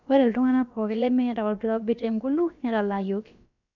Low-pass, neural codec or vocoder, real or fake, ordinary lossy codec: 7.2 kHz; codec, 16 kHz, about 1 kbps, DyCAST, with the encoder's durations; fake; none